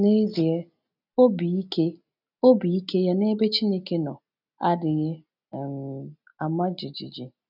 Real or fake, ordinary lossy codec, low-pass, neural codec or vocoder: real; none; 5.4 kHz; none